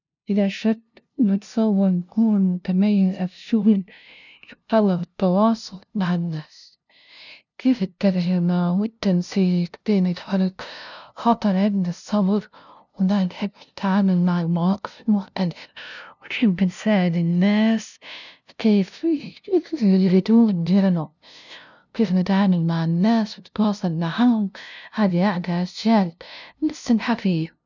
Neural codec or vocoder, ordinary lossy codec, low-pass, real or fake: codec, 16 kHz, 0.5 kbps, FunCodec, trained on LibriTTS, 25 frames a second; none; 7.2 kHz; fake